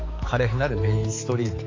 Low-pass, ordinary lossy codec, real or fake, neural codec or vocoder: 7.2 kHz; AAC, 32 kbps; fake; codec, 16 kHz, 4 kbps, X-Codec, HuBERT features, trained on balanced general audio